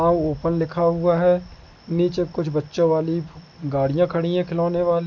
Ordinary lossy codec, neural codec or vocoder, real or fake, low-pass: none; none; real; 7.2 kHz